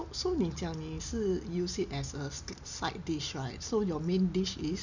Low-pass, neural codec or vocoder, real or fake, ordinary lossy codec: 7.2 kHz; none; real; none